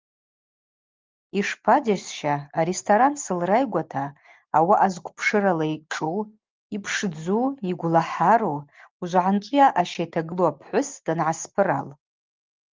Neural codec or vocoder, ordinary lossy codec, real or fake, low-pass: none; Opus, 32 kbps; real; 7.2 kHz